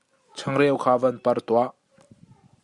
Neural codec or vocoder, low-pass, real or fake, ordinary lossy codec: none; 10.8 kHz; real; Opus, 64 kbps